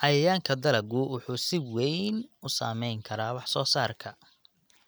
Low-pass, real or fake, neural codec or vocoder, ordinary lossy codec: none; real; none; none